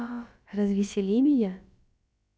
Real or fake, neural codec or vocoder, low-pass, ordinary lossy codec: fake; codec, 16 kHz, about 1 kbps, DyCAST, with the encoder's durations; none; none